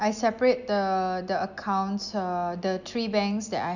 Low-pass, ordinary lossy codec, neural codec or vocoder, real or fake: 7.2 kHz; none; none; real